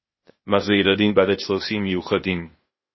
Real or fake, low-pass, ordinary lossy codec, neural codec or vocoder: fake; 7.2 kHz; MP3, 24 kbps; codec, 16 kHz, 0.8 kbps, ZipCodec